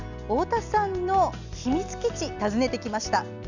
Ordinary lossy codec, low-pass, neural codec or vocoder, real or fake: none; 7.2 kHz; none; real